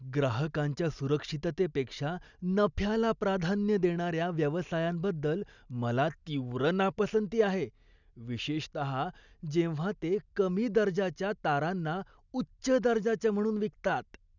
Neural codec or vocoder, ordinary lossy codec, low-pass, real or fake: none; none; 7.2 kHz; real